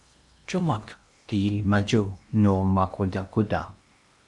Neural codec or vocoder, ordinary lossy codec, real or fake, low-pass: codec, 16 kHz in and 24 kHz out, 0.8 kbps, FocalCodec, streaming, 65536 codes; MP3, 64 kbps; fake; 10.8 kHz